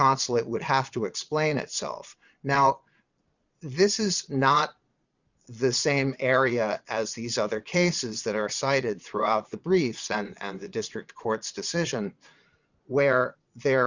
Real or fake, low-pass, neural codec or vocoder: fake; 7.2 kHz; vocoder, 22.05 kHz, 80 mel bands, Vocos